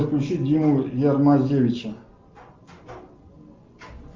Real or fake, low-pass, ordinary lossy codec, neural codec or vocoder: real; 7.2 kHz; Opus, 24 kbps; none